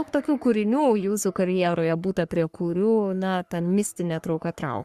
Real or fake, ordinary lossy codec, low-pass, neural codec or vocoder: fake; Opus, 64 kbps; 14.4 kHz; codec, 44.1 kHz, 3.4 kbps, Pupu-Codec